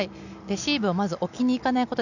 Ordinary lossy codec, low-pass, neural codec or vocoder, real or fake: none; 7.2 kHz; none; real